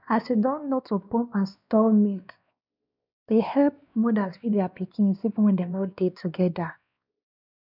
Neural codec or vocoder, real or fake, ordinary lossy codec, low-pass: codec, 16 kHz, 2 kbps, X-Codec, WavLM features, trained on Multilingual LibriSpeech; fake; none; 5.4 kHz